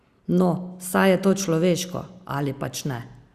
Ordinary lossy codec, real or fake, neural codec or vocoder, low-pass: Opus, 64 kbps; real; none; 14.4 kHz